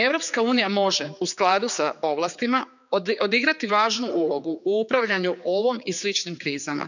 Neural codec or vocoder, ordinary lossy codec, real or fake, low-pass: codec, 16 kHz, 4 kbps, X-Codec, HuBERT features, trained on general audio; none; fake; 7.2 kHz